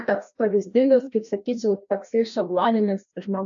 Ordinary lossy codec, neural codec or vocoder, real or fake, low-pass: AAC, 64 kbps; codec, 16 kHz, 1 kbps, FreqCodec, larger model; fake; 7.2 kHz